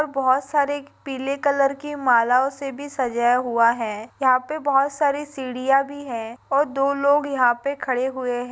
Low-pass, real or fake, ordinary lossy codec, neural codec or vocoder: none; real; none; none